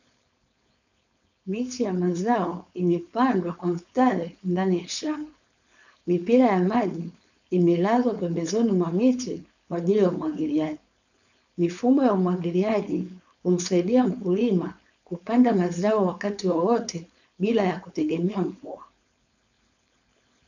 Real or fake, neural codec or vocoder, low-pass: fake; codec, 16 kHz, 4.8 kbps, FACodec; 7.2 kHz